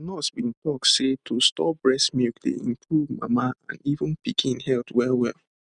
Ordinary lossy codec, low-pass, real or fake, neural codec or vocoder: none; 9.9 kHz; real; none